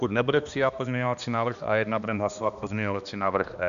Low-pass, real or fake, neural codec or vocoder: 7.2 kHz; fake; codec, 16 kHz, 2 kbps, X-Codec, HuBERT features, trained on balanced general audio